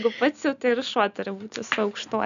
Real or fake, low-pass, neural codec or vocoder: real; 7.2 kHz; none